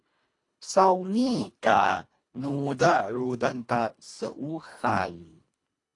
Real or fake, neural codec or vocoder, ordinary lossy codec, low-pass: fake; codec, 24 kHz, 1.5 kbps, HILCodec; AAC, 48 kbps; 10.8 kHz